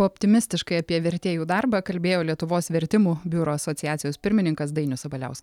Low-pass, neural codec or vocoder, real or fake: 19.8 kHz; none; real